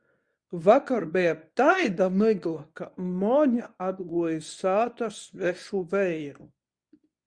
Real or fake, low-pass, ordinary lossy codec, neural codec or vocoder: fake; 9.9 kHz; Opus, 64 kbps; codec, 24 kHz, 0.9 kbps, WavTokenizer, medium speech release version 1